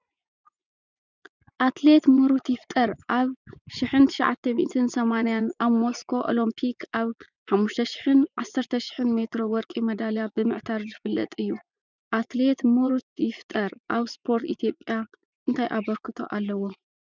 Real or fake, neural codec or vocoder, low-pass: fake; vocoder, 24 kHz, 100 mel bands, Vocos; 7.2 kHz